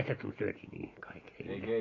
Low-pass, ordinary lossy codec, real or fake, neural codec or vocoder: 7.2 kHz; AAC, 32 kbps; real; none